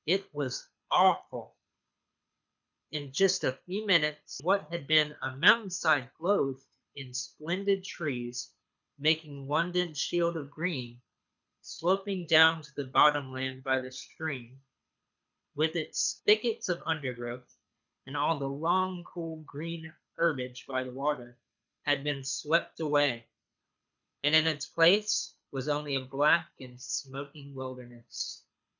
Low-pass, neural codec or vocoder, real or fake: 7.2 kHz; codec, 24 kHz, 6 kbps, HILCodec; fake